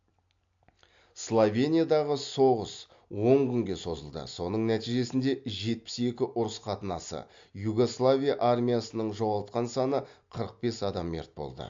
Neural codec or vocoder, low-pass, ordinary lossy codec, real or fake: none; 7.2 kHz; MP3, 48 kbps; real